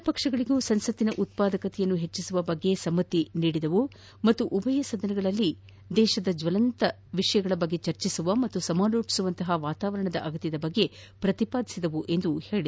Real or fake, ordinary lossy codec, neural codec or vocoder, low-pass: real; none; none; none